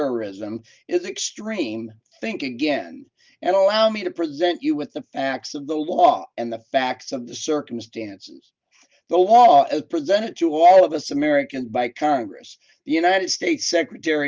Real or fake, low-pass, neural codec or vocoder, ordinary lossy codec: real; 7.2 kHz; none; Opus, 24 kbps